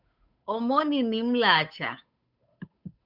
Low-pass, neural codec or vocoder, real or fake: 5.4 kHz; codec, 16 kHz, 8 kbps, FunCodec, trained on Chinese and English, 25 frames a second; fake